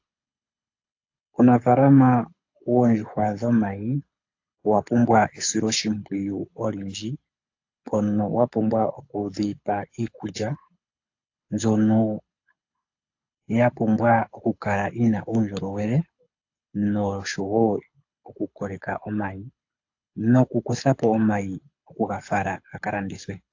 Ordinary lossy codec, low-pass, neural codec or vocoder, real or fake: AAC, 48 kbps; 7.2 kHz; codec, 24 kHz, 6 kbps, HILCodec; fake